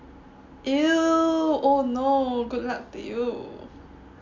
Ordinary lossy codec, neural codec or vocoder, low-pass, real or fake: MP3, 64 kbps; none; 7.2 kHz; real